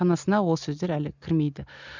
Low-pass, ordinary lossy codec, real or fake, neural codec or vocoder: 7.2 kHz; none; real; none